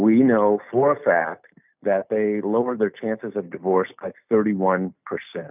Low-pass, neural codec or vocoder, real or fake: 3.6 kHz; none; real